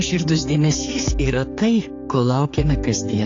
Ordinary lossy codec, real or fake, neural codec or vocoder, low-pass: AAC, 32 kbps; fake; codec, 16 kHz, 4 kbps, X-Codec, HuBERT features, trained on general audio; 7.2 kHz